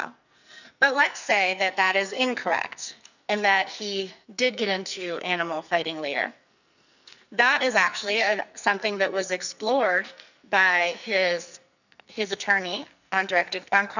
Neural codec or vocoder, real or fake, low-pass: codec, 44.1 kHz, 2.6 kbps, SNAC; fake; 7.2 kHz